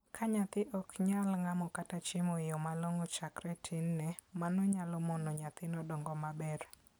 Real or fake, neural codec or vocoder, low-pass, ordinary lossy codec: real; none; none; none